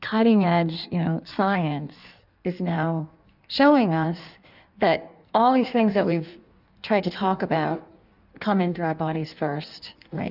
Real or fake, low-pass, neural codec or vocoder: fake; 5.4 kHz; codec, 16 kHz in and 24 kHz out, 1.1 kbps, FireRedTTS-2 codec